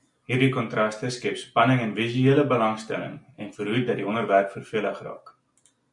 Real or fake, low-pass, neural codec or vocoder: real; 10.8 kHz; none